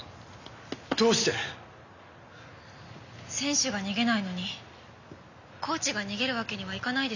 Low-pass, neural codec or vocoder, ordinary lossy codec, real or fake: 7.2 kHz; none; none; real